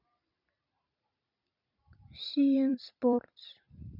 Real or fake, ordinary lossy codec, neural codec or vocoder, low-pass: fake; none; vocoder, 44.1 kHz, 128 mel bands every 256 samples, BigVGAN v2; 5.4 kHz